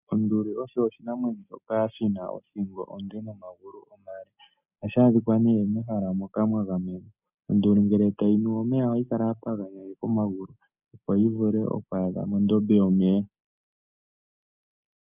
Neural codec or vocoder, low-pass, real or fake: none; 3.6 kHz; real